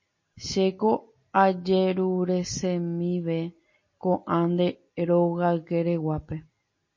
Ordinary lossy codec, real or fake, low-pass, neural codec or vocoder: MP3, 32 kbps; real; 7.2 kHz; none